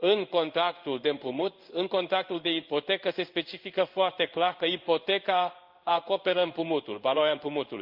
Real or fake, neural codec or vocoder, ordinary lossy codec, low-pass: fake; codec, 16 kHz in and 24 kHz out, 1 kbps, XY-Tokenizer; Opus, 24 kbps; 5.4 kHz